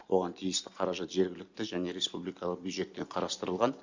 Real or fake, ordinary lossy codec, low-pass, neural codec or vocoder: real; Opus, 64 kbps; 7.2 kHz; none